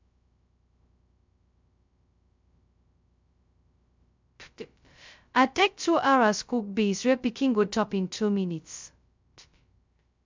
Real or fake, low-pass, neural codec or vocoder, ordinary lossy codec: fake; 7.2 kHz; codec, 16 kHz, 0.2 kbps, FocalCodec; MP3, 64 kbps